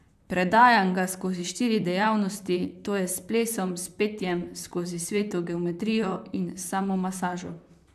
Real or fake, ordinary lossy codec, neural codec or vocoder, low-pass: fake; none; vocoder, 44.1 kHz, 128 mel bands, Pupu-Vocoder; 14.4 kHz